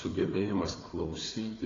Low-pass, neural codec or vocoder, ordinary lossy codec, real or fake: 7.2 kHz; codec, 16 kHz, 4 kbps, FunCodec, trained on Chinese and English, 50 frames a second; AAC, 32 kbps; fake